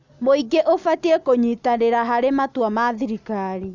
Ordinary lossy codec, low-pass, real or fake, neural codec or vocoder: Opus, 64 kbps; 7.2 kHz; real; none